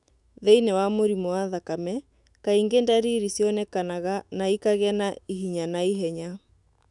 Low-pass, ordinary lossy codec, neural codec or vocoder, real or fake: 10.8 kHz; none; autoencoder, 48 kHz, 128 numbers a frame, DAC-VAE, trained on Japanese speech; fake